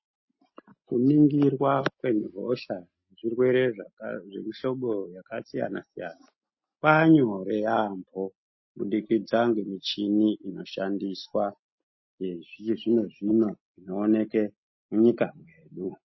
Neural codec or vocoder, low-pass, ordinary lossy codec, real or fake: none; 7.2 kHz; MP3, 24 kbps; real